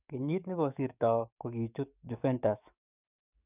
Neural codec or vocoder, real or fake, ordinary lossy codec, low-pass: codec, 44.1 kHz, 7.8 kbps, DAC; fake; none; 3.6 kHz